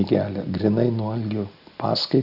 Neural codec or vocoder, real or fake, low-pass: none; real; 5.4 kHz